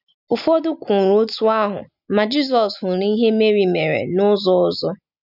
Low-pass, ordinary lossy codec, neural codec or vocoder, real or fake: 5.4 kHz; none; none; real